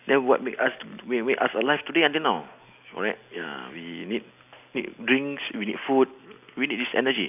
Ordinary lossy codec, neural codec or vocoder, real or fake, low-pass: none; none; real; 3.6 kHz